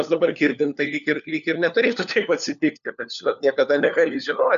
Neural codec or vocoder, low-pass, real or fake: codec, 16 kHz, 4 kbps, FunCodec, trained on LibriTTS, 50 frames a second; 7.2 kHz; fake